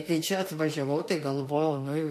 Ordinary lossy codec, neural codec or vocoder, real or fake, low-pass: MP3, 64 kbps; autoencoder, 48 kHz, 32 numbers a frame, DAC-VAE, trained on Japanese speech; fake; 14.4 kHz